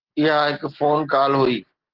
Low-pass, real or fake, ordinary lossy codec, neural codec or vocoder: 5.4 kHz; real; Opus, 16 kbps; none